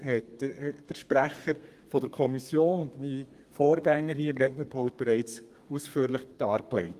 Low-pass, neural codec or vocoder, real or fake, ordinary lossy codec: 14.4 kHz; codec, 32 kHz, 1.9 kbps, SNAC; fake; Opus, 32 kbps